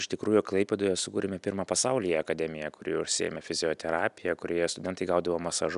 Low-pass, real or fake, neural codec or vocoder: 10.8 kHz; real; none